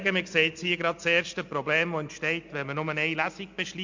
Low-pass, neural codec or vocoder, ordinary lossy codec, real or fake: 7.2 kHz; none; MP3, 48 kbps; real